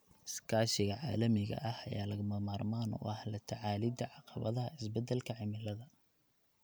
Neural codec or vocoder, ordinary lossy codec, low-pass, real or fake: none; none; none; real